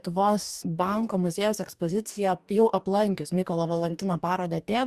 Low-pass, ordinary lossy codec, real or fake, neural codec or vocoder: 14.4 kHz; Opus, 64 kbps; fake; codec, 44.1 kHz, 2.6 kbps, DAC